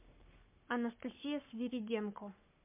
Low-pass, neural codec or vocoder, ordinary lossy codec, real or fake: 3.6 kHz; codec, 44.1 kHz, 7.8 kbps, Pupu-Codec; MP3, 32 kbps; fake